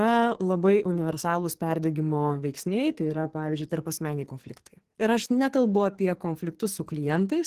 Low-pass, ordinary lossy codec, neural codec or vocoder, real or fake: 14.4 kHz; Opus, 16 kbps; codec, 44.1 kHz, 2.6 kbps, SNAC; fake